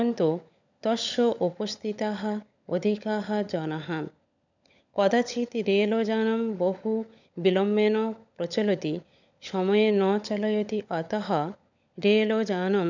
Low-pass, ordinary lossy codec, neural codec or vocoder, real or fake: 7.2 kHz; none; codec, 16 kHz, 16 kbps, FunCodec, trained on LibriTTS, 50 frames a second; fake